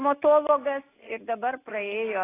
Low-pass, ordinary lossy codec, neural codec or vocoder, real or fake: 3.6 kHz; AAC, 16 kbps; none; real